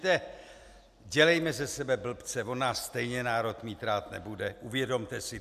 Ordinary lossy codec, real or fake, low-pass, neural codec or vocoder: AAC, 96 kbps; real; 14.4 kHz; none